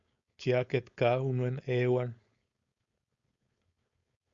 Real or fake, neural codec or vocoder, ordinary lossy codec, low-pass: fake; codec, 16 kHz, 4.8 kbps, FACodec; Opus, 64 kbps; 7.2 kHz